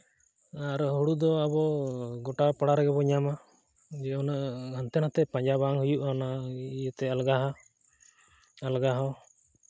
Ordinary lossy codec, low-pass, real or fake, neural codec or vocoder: none; none; real; none